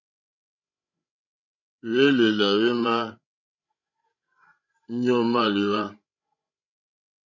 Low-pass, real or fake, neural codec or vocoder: 7.2 kHz; fake; codec, 16 kHz, 16 kbps, FreqCodec, larger model